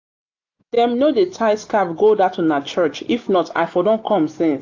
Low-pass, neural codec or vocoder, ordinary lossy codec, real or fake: 7.2 kHz; none; AAC, 48 kbps; real